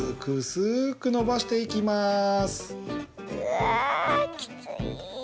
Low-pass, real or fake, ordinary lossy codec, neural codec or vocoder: none; real; none; none